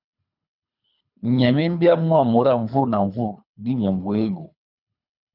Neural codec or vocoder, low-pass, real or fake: codec, 24 kHz, 3 kbps, HILCodec; 5.4 kHz; fake